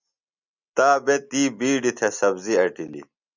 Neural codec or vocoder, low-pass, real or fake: none; 7.2 kHz; real